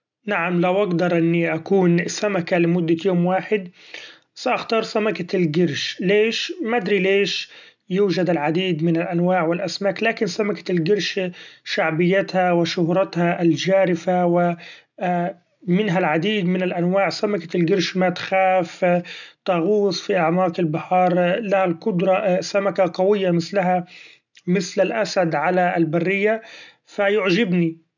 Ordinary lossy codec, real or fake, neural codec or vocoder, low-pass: none; real; none; 7.2 kHz